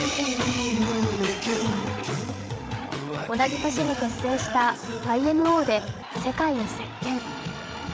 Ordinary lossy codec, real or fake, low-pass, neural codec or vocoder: none; fake; none; codec, 16 kHz, 8 kbps, FreqCodec, larger model